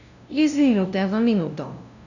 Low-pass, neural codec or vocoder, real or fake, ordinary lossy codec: 7.2 kHz; codec, 16 kHz, 0.5 kbps, FunCodec, trained on LibriTTS, 25 frames a second; fake; none